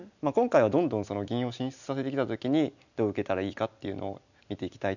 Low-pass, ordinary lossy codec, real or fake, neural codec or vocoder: 7.2 kHz; none; real; none